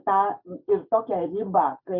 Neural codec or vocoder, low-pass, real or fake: none; 5.4 kHz; real